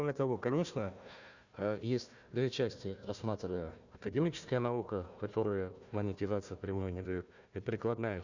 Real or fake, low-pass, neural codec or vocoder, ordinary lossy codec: fake; 7.2 kHz; codec, 16 kHz, 1 kbps, FunCodec, trained on Chinese and English, 50 frames a second; none